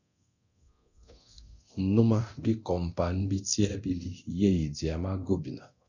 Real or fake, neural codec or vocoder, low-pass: fake; codec, 24 kHz, 0.9 kbps, DualCodec; 7.2 kHz